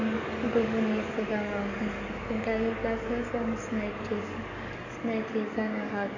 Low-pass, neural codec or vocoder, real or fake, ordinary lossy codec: 7.2 kHz; codec, 44.1 kHz, 7.8 kbps, DAC; fake; none